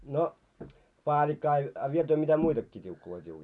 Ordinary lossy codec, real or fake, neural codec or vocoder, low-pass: none; real; none; 10.8 kHz